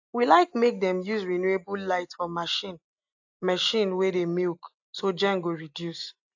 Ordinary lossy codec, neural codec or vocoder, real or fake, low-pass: AAC, 48 kbps; none; real; 7.2 kHz